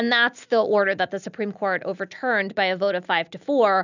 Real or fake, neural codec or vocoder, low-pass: real; none; 7.2 kHz